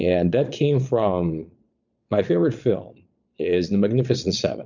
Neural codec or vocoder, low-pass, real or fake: vocoder, 22.05 kHz, 80 mel bands, Vocos; 7.2 kHz; fake